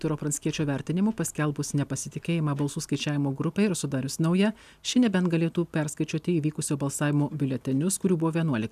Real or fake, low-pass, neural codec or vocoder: real; 14.4 kHz; none